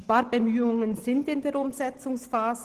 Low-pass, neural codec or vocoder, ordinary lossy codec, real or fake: 14.4 kHz; none; Opus, 16 kbps; real